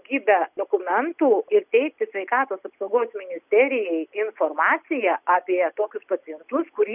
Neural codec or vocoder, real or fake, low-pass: none; real; 3.6 kHz